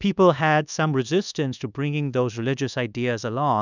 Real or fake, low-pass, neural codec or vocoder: fake; 7.2 kHz; codec, 24 kHz, 1.2 kbps, DualCodec